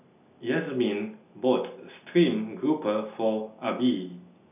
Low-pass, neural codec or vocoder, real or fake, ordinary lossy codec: 3.6 kHz; none; real; none